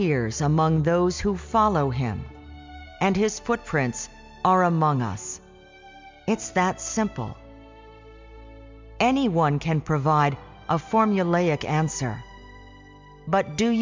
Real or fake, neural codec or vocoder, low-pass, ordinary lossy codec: real; none; 7.2 kHz; MP3, 64 kbps